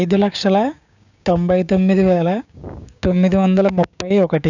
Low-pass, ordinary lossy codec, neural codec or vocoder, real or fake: 7.2 kHz; none; codec, 16 kHz, 6 kbps, DAC; fake